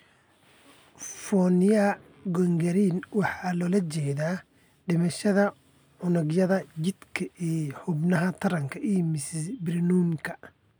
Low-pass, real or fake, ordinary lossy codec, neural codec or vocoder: none; real; none; none